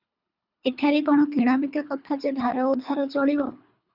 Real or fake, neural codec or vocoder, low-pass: fake; codec, 24 kHz, 3 kbps, HILCodec; 5.4 kHz